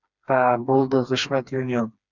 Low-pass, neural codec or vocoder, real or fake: 7.2 kHz; codec, 16 kHz, 2 kbps, FreqCodec, smaller model; fake